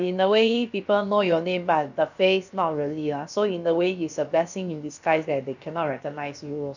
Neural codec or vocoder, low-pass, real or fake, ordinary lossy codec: codec, 16 kHz, about 1 kbps, DyCAST, with the encoder's durations; 7.2 kHz; fake; none